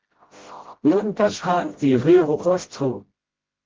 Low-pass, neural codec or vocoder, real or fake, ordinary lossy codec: 7.2 kHz; codec, 16 kHz, 0.5 kbps, FreqCodec, smaller model; fake; Opus, 16 kbps